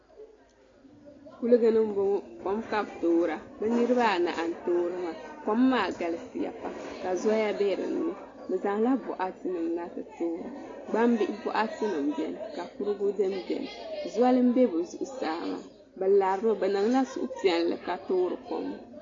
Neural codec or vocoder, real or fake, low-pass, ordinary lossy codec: none; real; 7.2 kHz; AAC, 32 kbps